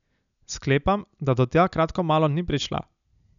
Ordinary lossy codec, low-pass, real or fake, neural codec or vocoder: none; 7.2 kHz; real; none